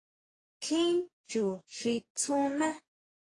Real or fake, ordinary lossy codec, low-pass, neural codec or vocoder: fake; AAC, 32 kbps; 10.8 kHz; codec, 44.1 kHz, 2.6 kbps, DAC